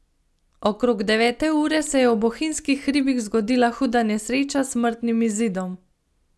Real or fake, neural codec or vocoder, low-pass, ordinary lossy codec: real; none; none; none